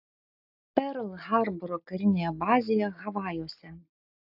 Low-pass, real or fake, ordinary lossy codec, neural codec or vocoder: 5.4 kHz; real; AAC, 48 kbps; none